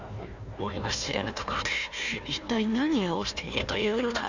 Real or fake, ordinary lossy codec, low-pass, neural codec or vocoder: fake; none; 7.2 kHz; codec, 16 kHz, 1 kbps, FunCodec, trained on Chinese and English, 50 frames a second